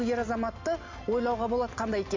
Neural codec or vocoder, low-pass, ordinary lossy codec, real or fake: none; 7.2 kHz; MP3, 48 kbps; real